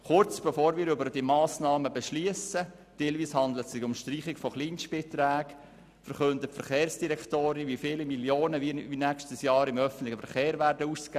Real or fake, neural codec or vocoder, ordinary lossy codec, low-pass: real; none; none; 14.4 kHz